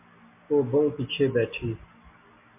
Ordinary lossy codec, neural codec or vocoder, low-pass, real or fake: MP3, 32 kbps; none; 3.6 kHz; real